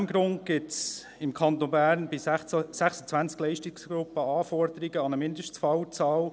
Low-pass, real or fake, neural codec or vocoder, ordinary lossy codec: none; real; none; none